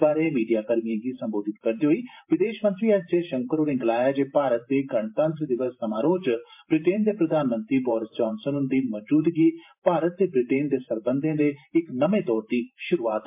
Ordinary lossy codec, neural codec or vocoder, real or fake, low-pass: MP3, 32 kbps; vocoder, 44.1 kHz, 128 mel bands every 512 samples, BigVGAN v2; fake; 3.6 kHz